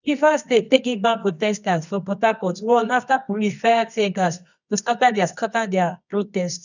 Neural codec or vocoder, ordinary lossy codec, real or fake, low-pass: codec, 24 kHz, 0.9 kbps, WavTokenizer, medium music audio release; none; fake; 7.2 kHz